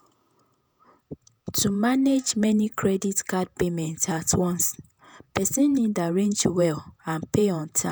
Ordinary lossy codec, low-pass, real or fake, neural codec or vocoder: none; none; fake; vocoder, 48 kHz, 128 mel bands, Vocos